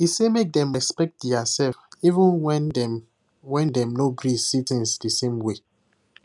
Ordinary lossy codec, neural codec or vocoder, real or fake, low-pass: none; none; real; 10.8 kHz